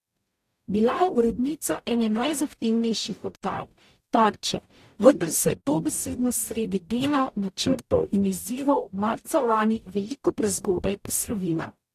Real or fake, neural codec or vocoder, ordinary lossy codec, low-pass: fake; codec, 44.1 kHz, 0.9 kbps, DAC; AAC, 64 kbps; 14.4 kHz